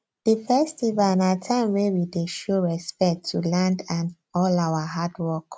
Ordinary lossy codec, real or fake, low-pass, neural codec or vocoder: none; real; none; none